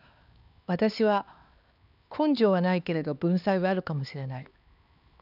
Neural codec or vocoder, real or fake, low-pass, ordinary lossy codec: codec, 16 kHz, 2 kbps, X-Codec, HuBERT features, trained on LibriSpeech; fake; 5.4 kHz; none